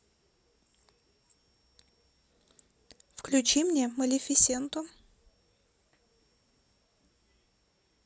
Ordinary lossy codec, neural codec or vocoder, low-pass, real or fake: none; none; none; real